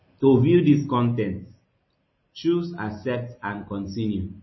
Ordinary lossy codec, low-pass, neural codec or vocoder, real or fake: MP3, 24 kbps; 7.2 kHz; none; real